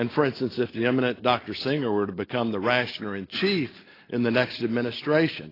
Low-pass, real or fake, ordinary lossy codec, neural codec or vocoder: 5.4 kHz; real; AAC, 24 kbps; none